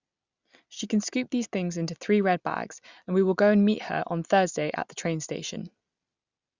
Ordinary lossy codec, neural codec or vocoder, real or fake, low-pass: Opus, 64 kbps; none; real; 7.2 kHz